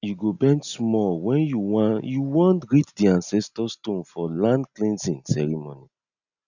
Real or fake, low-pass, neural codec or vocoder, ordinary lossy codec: real; 7.2 kHz; none; none